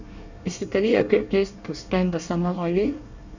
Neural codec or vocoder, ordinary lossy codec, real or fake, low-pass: codec, 24 kHz, 1 kbps, SNAC; none; fake; 7.2 kHz